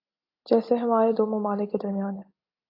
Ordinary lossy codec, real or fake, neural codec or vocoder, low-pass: AAC, 32 kbps; real; none; 5.4 kHz